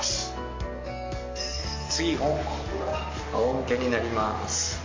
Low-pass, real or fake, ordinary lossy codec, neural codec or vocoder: 7.2 kHz; fake; MP3, 48 kbps; codec, 44.1 kHz, 7.8 kbps, DAC